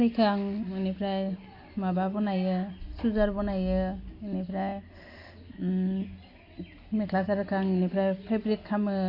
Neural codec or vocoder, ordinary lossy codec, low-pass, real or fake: none; AAC, 32 kbps; 5.4 kHz; real